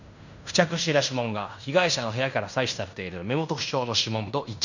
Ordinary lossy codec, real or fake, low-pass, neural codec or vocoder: none; fake; 7.2 kHz; codec, 16 kHz in and 24 kHz out, 0.9 kbps, LongCat-Audio-Codec, fine tuned four codebook decoder